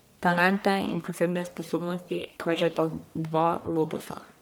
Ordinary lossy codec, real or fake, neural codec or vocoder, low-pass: none; fake; codec, 44.1 kHz, 1.7 kbps, Pupu-Codec; none